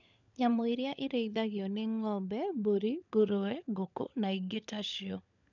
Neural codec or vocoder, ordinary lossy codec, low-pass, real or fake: codec, 16 kHz, 16 kbps, FunCodec, trained on LibriTTS, 50 frames a second; none; 7.2 kHz; fake